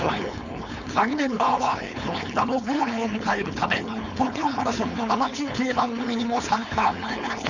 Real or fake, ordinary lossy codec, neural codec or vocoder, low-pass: fake; none; codec, 16 kHz, 4.8 kbps, FACodec; 7.2 kHz